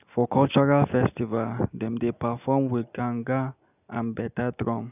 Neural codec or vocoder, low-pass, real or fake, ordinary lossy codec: none; 3.6 kHz; real; none